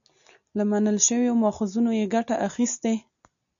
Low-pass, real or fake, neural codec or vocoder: 7.2 kHz; real; none